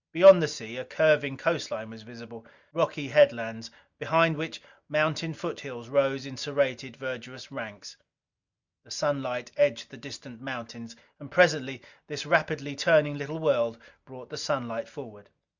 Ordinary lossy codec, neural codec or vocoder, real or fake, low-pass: Opus, 64 kbps; none; real; 7.2 kHz